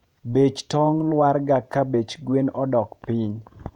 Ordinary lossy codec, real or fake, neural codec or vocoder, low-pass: none; fake; vocoder, 48 kHz, 128 mel bands, Vocos; 19.8 kHz